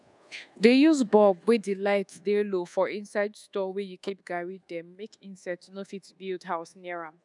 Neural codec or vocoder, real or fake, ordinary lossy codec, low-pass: codec, 24 kHz, 1.2 kbps, DualCodec; fake; none; 10.8 kHz